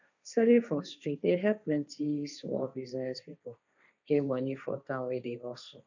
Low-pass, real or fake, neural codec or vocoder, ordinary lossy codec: 7.2 kHz; fake; codec, 16 kHz, 1.1 kbps, Voila-Tokenizer; none